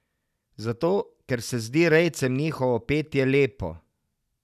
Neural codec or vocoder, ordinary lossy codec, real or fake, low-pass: none; none; real; 14.4 kHz